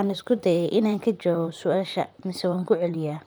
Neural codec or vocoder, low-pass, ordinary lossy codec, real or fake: vocoder, 44.1 kHz, 128 mel bands, Pupu-Vocoder; none; none; fake